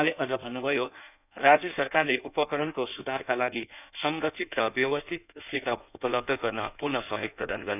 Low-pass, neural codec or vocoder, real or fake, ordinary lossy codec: 3.6 kHz; codec, 16 kHz in and 24 kHz out, 1.1 kbps, FireRedTTS-2 codec; fake; none